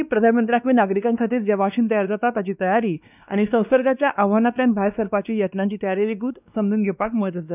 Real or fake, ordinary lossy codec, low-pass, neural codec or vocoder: fake; none; 3.6 kHz; codec, 16 kHz, 2 kbps, X-Codec, WavLM features, trained on Multilingual LibriSpeech